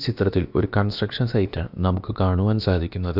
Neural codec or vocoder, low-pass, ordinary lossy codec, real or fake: codec, 16 kHz, about 1 kbps, DyCAST, with the encoder's durations; 5.4 kHz; none; fake